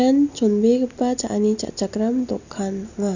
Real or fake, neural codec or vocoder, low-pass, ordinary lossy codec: real; none; 7.2 kHz; none